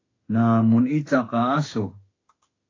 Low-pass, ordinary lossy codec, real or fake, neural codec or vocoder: 7.2 kHz; AAC, 32 kbps; fake; autoencoder, 48 kHz, 32 numbers a frame, DAC-VAE, trained on Japanese speech